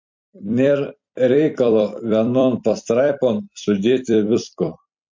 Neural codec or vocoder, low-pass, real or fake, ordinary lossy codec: vocoder, 44.1 kHz, 128 mel bands every 256 samples, BigVGAN v2; 7.2 kHz; fake; MP3, 48 kbps